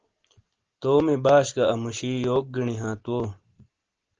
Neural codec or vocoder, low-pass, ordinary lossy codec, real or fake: none; 7.2 kHz; Opus, 16 kbps; real